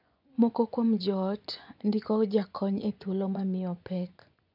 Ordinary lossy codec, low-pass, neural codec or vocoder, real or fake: none; 5.4 kHz; vocoder, 24 kHz, 100 mel bands, Vocos; fake